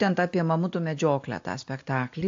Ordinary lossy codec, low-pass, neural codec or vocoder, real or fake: AAC, 48 kbps; 7.2 kHz; none; real